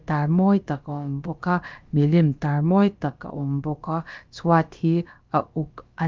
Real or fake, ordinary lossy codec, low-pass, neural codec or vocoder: fake; Opus, 24 kbps; 7.2 kHz; codec, 16 kHz, about 1 kbps, DyCAST, with the encoder's durations